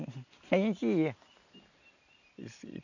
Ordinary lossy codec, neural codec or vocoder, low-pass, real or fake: none; none; 7.2 kHz; real